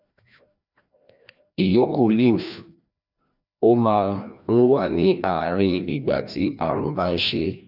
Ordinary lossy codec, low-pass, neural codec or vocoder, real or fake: none; 5.4 kHz; codec, 16 kHz, 1 kbps, FreqCodec, larger model; fake